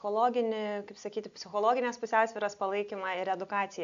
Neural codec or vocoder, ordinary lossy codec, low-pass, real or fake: none; AAC, 96 kbps; 7.2 kHz; real